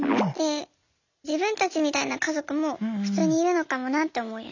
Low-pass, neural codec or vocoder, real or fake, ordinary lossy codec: 7.2 kHz; none; real; none